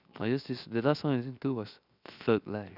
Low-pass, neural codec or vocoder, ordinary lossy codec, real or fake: 5.4 kHz; codec, 16 kHz, 0.7 kbps, FocalCodec; none; fake